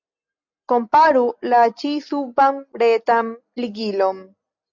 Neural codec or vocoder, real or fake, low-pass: none; real; 7.2 kHz